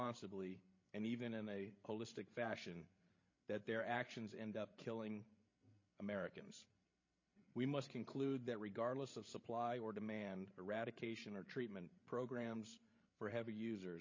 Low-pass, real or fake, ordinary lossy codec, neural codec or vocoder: 7.2 kHz; fake; MP3, 32 kbps; codec, 16 kHz, 16 kbps, FreqCodec, larger model